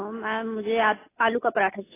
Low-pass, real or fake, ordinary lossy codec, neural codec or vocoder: 3.6 kHz; real; AAC, 16 kbps; none